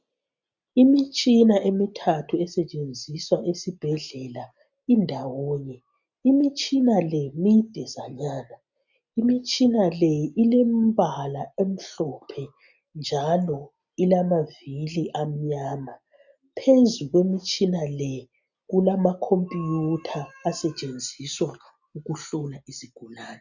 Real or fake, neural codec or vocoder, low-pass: real; none; 7.2 kHz